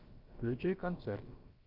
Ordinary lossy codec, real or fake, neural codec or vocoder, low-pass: Opus, 24 kbps; fake; codec, 16 kHz, about 1 kbps, DyCAST, with the encoder's durations; 5.4 kHz